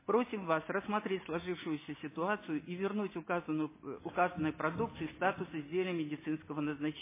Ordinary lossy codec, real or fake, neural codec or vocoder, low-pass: MP3, 16 kbps; real; none; 3.6 kHz